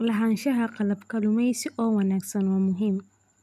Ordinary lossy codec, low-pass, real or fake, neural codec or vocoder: none; 14.4 kHz; real; none